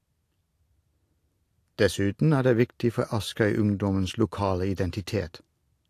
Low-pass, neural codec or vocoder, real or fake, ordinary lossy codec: 14.4 kHz; none; real; AAC, 64 kbps